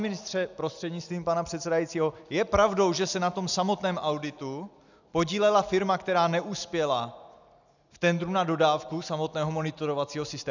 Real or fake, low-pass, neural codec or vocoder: real; 7.2 kHz; none